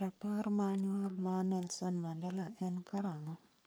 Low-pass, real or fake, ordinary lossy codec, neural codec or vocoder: none; fake; none; codec, 44.1 kHz, 3.4 kbps, Pupu-Codec